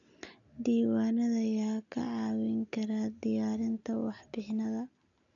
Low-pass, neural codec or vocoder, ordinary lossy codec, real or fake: 7.2 kHz; none; none; real